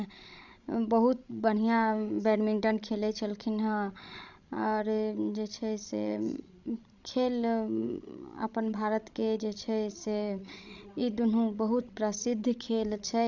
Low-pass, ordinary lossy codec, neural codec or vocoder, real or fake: 7.2 kHz; none; codec, 16 kHz, 16 kbps, FreqCodec, larger model; fake